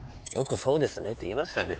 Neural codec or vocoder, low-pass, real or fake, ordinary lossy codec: codec, 16 kHz, 4 kbps, X-Codec, HuBERT features, trained on LibriSpeech; none; fake; none